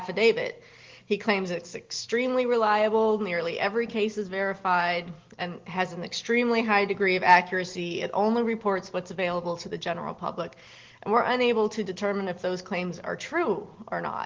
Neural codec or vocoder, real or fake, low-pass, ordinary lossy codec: none; real; 7.2 kHz; Opus, 16 kbps